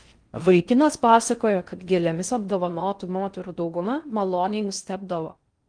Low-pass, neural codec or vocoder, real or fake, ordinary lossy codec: 9.9 kHz; codec, 16 kHz in and 24 kHz out, 0.6 kbps, FocalCodec, streaming, 4096 codes; fake; Opus, 32 kbps